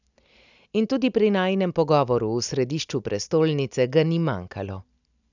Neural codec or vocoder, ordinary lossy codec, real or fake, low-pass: none; none; real; 7.2 kHz